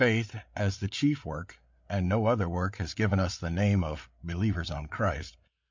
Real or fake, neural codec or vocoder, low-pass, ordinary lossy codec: fake; codec, 16 kHz, 8 kbps, FreqCodec, larger model; 7.2 kHz; MP3, 48 kbps